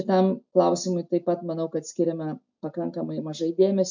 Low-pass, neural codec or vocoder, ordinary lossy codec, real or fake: 7.2 kHz; none; MP3, 64 kbps; real